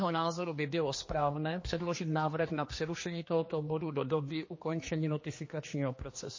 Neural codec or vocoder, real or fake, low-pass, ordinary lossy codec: codec, 16 kHz, 2 kbps, X-Codec, HuBERT features, trained on general audio; fake; 7.2 kHz; MP3, 32 kbps